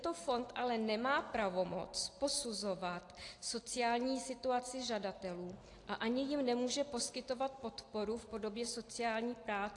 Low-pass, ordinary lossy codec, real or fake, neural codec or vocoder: 10.8 kHz; AAC, 48 kbps; real; none